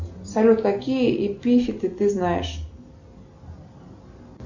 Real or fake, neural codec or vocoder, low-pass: real; none; 7.2 kHz